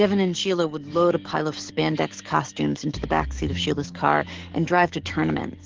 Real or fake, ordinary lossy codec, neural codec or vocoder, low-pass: fake; Opus, 16 kbps; codec, 44.1 kHz, 7.8 kbps, DAC; 7.2 kHz